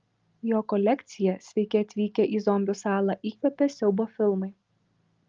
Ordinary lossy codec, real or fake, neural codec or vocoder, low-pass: Opus, 32 kbps; real; none; 7.2 kHz